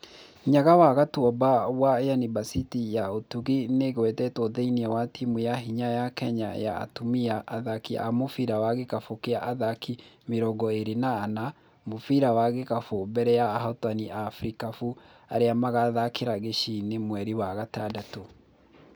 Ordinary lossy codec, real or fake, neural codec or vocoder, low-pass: none; real; none; none